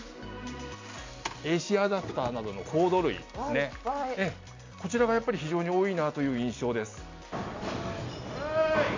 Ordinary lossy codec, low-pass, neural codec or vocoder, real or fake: MP3, 48 kbps; 7.2 kHz; none; real